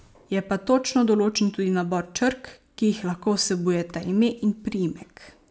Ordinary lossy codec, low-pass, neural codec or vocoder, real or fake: none; none; none; real